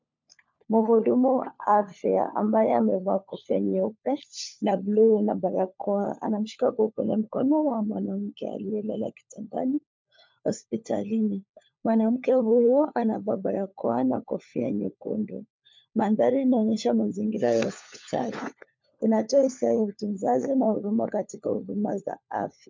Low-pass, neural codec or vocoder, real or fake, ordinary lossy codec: 7.2 kHz; codec, 16 kHz, 4 kbps, FunCodec, trained on LibriTTS, 50 frames a second; fake; MP3, 64 kbps